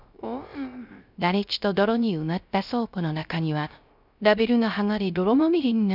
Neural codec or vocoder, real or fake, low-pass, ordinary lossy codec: codec, 16 kHz, 0.3 kbps, FocalCodec; fake; 5.4 kHz; none